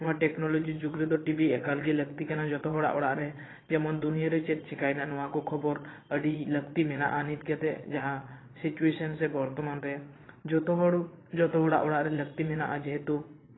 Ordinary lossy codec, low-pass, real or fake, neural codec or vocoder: AAC, 16 kbps; 7.2 kHz; fake; vocoder, 22.05 kHz, 80 mel bands, Vocos